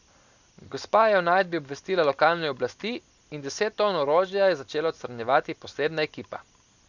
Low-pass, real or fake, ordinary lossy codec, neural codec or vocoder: 7.2 kHz; real; none; none